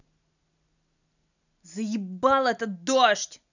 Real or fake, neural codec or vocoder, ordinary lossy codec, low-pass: real; none; none; 7.2 kHz